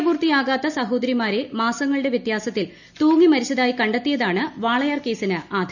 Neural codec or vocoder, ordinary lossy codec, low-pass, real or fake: none; none; 7.2 kHz; real